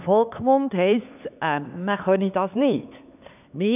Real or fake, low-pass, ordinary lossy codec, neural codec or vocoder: fake; 3.6 kHz; none; codec, 16 kHz, 4 kbps, X-Codec, HuBERT features, trained on LibriSpeech